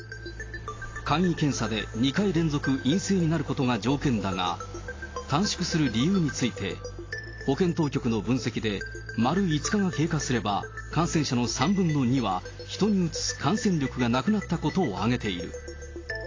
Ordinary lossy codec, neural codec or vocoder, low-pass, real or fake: AAC, 32 kbps; none; 7.2 kHz; real